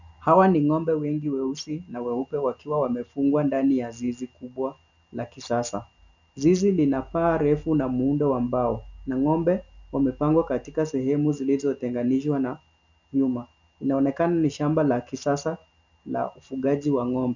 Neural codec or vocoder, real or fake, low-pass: none; real; 7.2 kHz